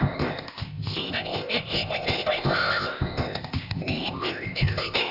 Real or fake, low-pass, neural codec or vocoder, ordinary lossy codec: fake; 5.4 kHz; codec, 16 kHz, 0.8 kbps, ZipCodec; none